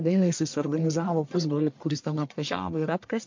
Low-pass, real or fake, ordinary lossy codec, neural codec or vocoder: 7.2 kHz; fake; MP3, 48 kbps; codec, 44.1 kHz, 1.7 kbps, Pupu-Codec